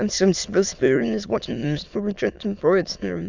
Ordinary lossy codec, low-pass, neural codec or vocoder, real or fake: Opus, 64 kbps; 7.2 kHz; autoencoder, 22.05 kHz, a latent of 192 numbers a frame, VITS, trained on many speakers; fake